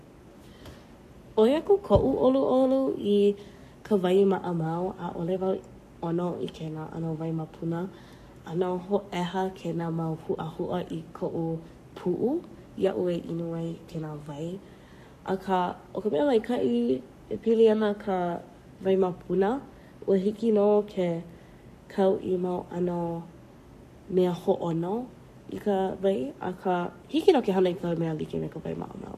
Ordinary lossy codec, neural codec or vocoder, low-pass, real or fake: none; codec, 44.1 kHz, 7.8 kbps, Pupu-Codec; 14.4 kHz; fake